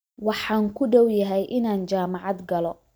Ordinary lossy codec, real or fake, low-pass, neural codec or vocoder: none; real; none; none